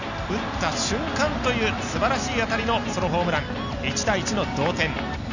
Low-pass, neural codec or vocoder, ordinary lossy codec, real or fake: 7.2 kHz; none; none; real